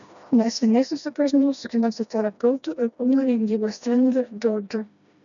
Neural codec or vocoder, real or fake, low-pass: codec, 16 kHz, 1 kbps, FreqCodec, smaller model; fake; 7.2 kHz